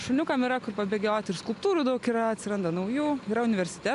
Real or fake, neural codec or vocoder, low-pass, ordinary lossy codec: real; none; 10.8 kHz; AAC, 96 kbps